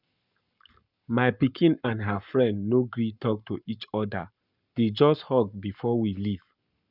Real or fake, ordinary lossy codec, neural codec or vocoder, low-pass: fake; none; codec, 44.1 kHz, 7.8 kbps, Pupu-Codec; 5.4 kHz